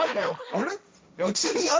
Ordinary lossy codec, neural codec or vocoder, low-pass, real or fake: none; codec, 16 kHz, 1.1 kbps, Voila-Tokenizer; none; fake